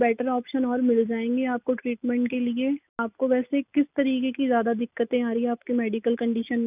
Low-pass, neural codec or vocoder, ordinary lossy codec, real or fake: 3.6 kHz; none; none; real